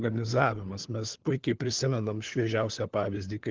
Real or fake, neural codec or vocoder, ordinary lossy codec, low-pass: fake; codec, 16 kHz, 4 kbps, FunCodec, trained on LibriTTS, 50 frames a second; Opus, 16 kbps; 7.2 kHz